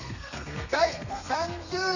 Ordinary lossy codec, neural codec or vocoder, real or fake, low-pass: none; codec, 32 kHz, 1.9 kbps, SNAC; fake; 7.2 kHz